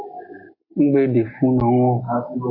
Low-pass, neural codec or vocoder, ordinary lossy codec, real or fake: 5.4 kHz; none; AAC, 32 kbps; real